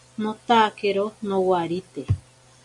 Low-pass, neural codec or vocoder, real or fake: 10.8 kHz; none; real